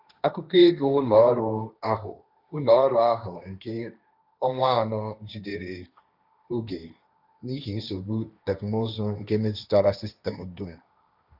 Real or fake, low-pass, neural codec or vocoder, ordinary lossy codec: fake; 5.4 kHz; codec, 16 kHz, 1.1 kbps, Voila-Tokenizer; none